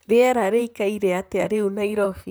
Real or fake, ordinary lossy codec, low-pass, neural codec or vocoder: fake; none; none; vocoder, 44.1 kHz, 128 mel bands, Pupu-Vocoder